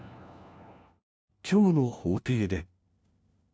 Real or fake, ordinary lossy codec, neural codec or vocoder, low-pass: fake; none; codec, 16 kHz, 1 kbps, FunCodec, trained on LibriTTS, 50 frames a second; none